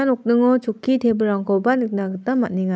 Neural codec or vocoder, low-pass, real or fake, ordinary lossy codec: none; none; real; none